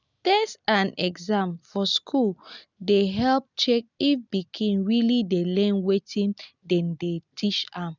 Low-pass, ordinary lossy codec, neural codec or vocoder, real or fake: 7.2 kHz; none; none; real